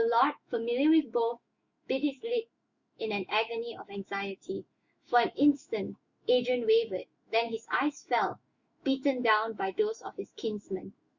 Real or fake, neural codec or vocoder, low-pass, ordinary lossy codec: fake; autoencoder, 48 kHz, 128 numbers a frame, DAC-VAE, trained on Japanese speech; 7.2 kHz; Opus, 64 kbps